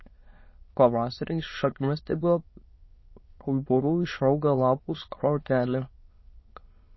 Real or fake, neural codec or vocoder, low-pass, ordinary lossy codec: fake; autoencoder, 22.05 kHz, a latent of 192 numbers a frame, VITS, trained on many speakers; 7.2 kHz; MP3, 24 kbps